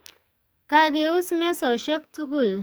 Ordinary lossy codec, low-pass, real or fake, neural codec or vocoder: none; none; fake; codec, 44.1 kHz, 2.6 kbps, SNAC